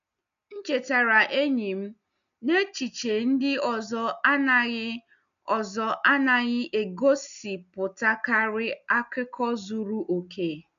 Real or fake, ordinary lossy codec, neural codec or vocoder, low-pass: real; none; none; 7.2 kHz